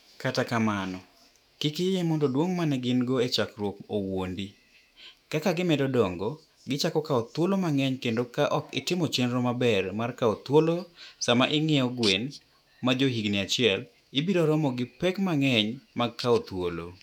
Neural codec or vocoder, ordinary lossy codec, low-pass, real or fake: autoencoder, 48 kHz, 128 numbers a frame, DAC-VAE, trained on Japanese speech; none; 19.8 kHz; fake